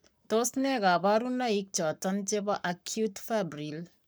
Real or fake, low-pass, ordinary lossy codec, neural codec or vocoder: fake; none; none; codec, 44.1 kHz, 7.8 kbps, Pupu-Codec